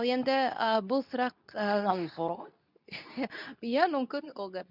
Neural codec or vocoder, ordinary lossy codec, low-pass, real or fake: codec, 24 kHz, 0.9 kbps, WavTokenizer, medium speech release version 2; none; 5.4 kHz; fake